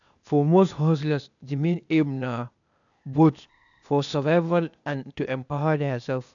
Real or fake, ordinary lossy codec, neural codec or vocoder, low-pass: fake; none; codec, 16 kHz, 0.8 kbps, ZipCodec; 7.2 kHz